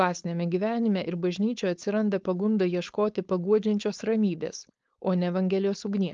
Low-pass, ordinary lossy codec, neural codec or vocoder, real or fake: 7.2 kHz; Opus, 24 kbps; codec, 16 kHz, 4.8 kbps, FACodec; fake